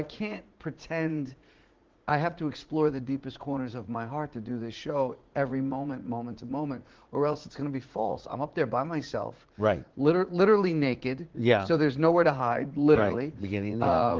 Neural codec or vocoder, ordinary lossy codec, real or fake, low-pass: vocoder, 44.1 kHz, 80 mel bands, Vocos; Opus, 16 kbps; fake; 7.2 kHz